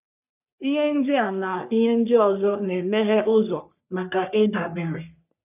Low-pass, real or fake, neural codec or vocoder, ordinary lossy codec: 3.6 kHz; fake; codec, 44.1 kHz, 3.4 kbps, Pupu-Codec; none